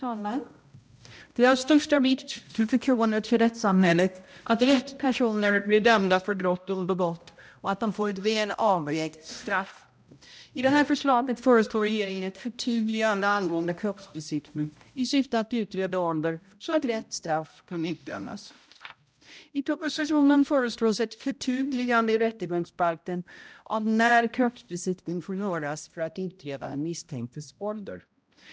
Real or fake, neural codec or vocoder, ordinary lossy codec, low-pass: fake; codec, 16 kHz, 0.5 kbps, X-Codec, HuBERT features, trained on balanced general audio; none; none